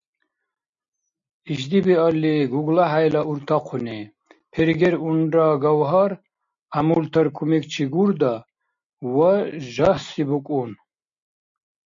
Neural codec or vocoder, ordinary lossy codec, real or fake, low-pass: none; MP3, 48 kbps; real; 7.2 kHz